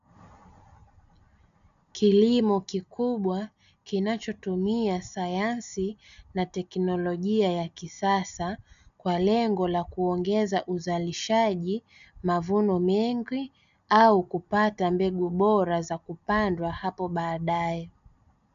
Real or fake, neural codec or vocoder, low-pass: real; none; 7.2 kHz